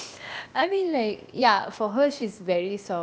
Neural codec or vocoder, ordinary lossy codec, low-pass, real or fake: codec, 16 kHz, 0.8 kbps, ZipCodec; none; none; fake